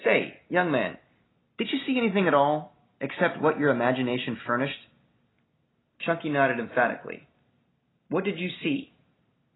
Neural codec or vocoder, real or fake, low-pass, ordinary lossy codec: none; real; 7.2 kHz; AAC, 16 kbps